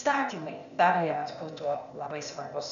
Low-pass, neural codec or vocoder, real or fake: 7.2 kHz; codec, 16 kHz, 0.8 kbps, ZipCodec; fake